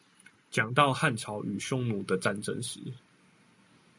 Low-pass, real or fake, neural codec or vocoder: 10.8 kHz; real; none